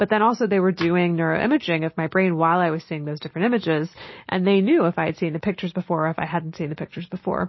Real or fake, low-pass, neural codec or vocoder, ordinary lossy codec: real; 7.2 kHz; none; MP3, 24 kbps